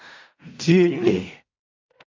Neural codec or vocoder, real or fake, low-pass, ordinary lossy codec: codec, 16 kHz in and 24 kHz out, 0.4 kbps, LongCat-Audio-Codec, fine tuned four codebook decoder; fake; 7.2 kHz; MP3, 64 kbps